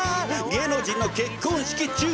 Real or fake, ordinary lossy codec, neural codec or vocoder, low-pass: real; none; none; none